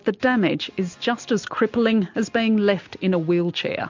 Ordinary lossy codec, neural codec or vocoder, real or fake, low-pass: MP3, 48 kbps; none; real; 7.2 kHz